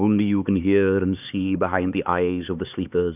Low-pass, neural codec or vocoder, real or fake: 3.6 kHz; codec, 16 kHz, 4 kbps, X-Codec, HuBERT features, trained on LibriSpeech; fake